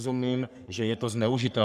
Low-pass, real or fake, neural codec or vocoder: 14.4 kHz; fake; codec, 44.1 kHz, 3.4 kbps, Pupu-Codec